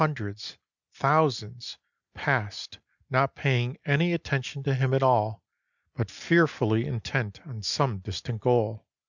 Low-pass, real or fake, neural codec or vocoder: 7.2 kHz; real; none